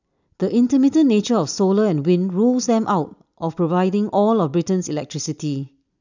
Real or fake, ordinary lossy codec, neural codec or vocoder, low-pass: real; none; none; 7.2 kHz